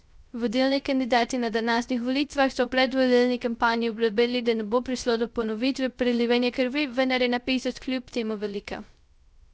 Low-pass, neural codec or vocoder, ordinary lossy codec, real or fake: none; codec, 16 kHz, 0.3 kbps, FocalCodec; none; fake